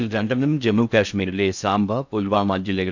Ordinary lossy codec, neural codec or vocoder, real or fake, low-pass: none; codec, 16 kHz in and 24 kHz out, 0.6 kbps, FocalCodec, streaming, 2048 codes; fake; 7.2 kHz